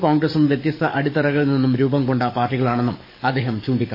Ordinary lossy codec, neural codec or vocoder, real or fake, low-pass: MP3, 24 kbps; codec, 44.1 kHz, 7.8 kbps, DAC; fake; 5.4 kHz